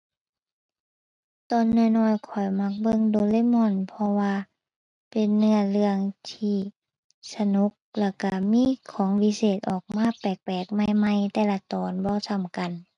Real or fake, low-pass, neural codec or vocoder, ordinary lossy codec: real; 10.8 kHz; none; none